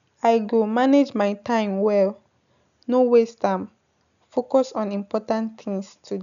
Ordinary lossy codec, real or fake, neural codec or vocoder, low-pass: none; real; none; 7.2 kHz